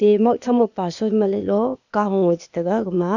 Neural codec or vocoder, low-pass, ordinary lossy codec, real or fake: codec, 16 kHz, 0.8 kbps, ZipCodec; 7.2 kHz; none; fake